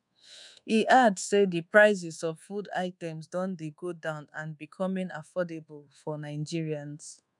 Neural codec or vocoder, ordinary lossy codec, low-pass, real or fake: codec, 24 kHz, 1.2 kbps, DualCodec; none; 10.8 kHz; fake